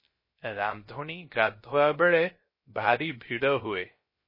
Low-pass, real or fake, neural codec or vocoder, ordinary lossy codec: 5.4 kHz; fake; codec, 16 kHz, 0.3 kbps, FocalCodec; MP3, 24 kbps